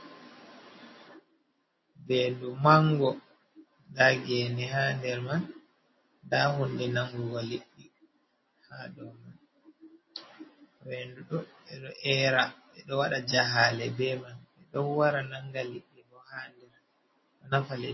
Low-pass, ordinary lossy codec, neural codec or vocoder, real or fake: 7.2 kHz; MP3, 24 kbps; none; real